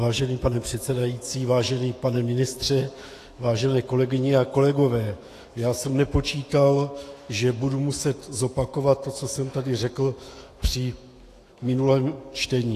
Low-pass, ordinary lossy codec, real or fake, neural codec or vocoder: 14.4 kHz; AAC, 48 kbps; fake; autoencoder, 48 kHz, 128 numbers a frame, DAC-VAE, trained on Japanese speech